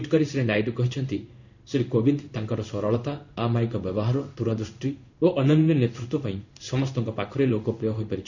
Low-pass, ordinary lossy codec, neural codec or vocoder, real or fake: 7.2 kHz; none; codec, 16 kHz in and 24 kHz out, 1 kbps, XY-Tokenizer; fake